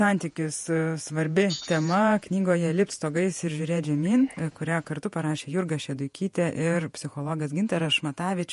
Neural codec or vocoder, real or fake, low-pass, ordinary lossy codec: vocoder, 48 kHz, 128 mel bands, Vocos; fake; 14.4 kHz; MP3, 48 kbps